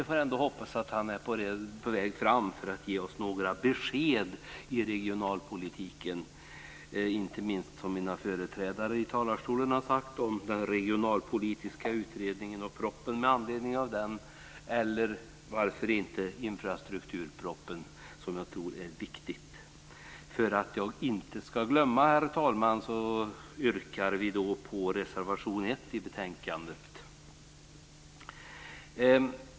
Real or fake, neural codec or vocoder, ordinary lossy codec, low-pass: real; none; none; none